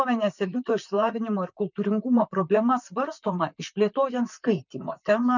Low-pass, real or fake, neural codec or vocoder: 7.2 kHz; fake; vocoder, 44.1 kHz, 128 mel bands, Pupu-Vocoder